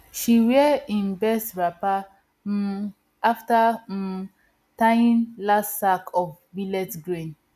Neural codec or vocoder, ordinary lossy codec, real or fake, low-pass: none; none; real; 14.4 kHz